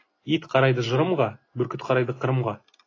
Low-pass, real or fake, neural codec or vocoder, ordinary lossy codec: 7.2 kHz; real; none; AAC, 32 kbps